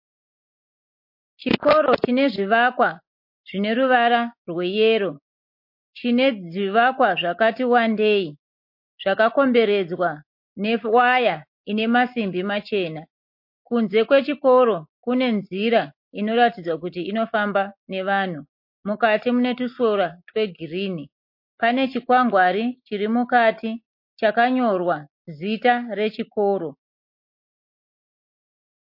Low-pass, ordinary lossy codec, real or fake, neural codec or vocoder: 5.4 kHz; MP3, 32 kbps; real; none